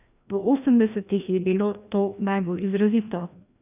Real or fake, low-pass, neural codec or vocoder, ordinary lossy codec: fake; 3.6 kHz; codec, 16 kHz, 1 kbps, FreqCodec, larger model; none